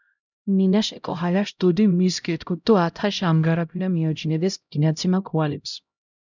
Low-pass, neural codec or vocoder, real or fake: 7.2 kHz; codec, 16 kHz, 0.5 kbps, X-Codec, HuBERT features, trained on LibriSpeech; fake